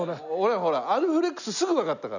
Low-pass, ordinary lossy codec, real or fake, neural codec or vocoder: 7.2 kHz; none; real; none